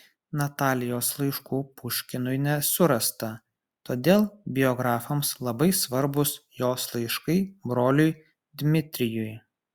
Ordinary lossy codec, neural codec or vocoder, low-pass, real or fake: Opus, 64 kbps; none; 19.8 kHz; real